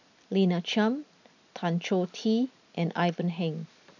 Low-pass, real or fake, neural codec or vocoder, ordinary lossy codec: 7.2 kHz; real; none; none